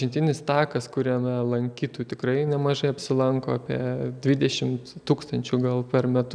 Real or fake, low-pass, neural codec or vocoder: real; 9.9 kHz; none